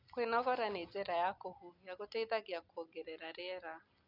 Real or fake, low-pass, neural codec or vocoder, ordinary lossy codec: real; 5.4 kHz; none; none